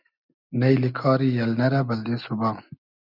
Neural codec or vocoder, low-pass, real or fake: none; 5.4 kHz; real